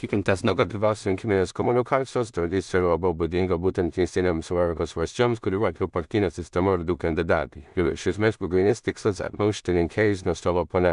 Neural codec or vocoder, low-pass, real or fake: codec, 16 kHz in and 24 kHz out, 0.4 kbps, LongCat-Audio-Codec, two codebook decoder; 10.8 kHz; fake